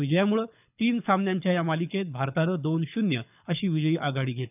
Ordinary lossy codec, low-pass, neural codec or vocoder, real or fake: none; 3.6 kHz; codec, 24 kHz, 6 kbps, HILCodec; fake